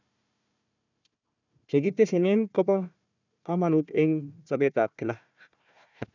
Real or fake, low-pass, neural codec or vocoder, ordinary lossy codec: fake; 7.2 kHz; codec, 16 kHz, 1 kbps, FunCodec, trained on Chinese and English, 50 frames a second; none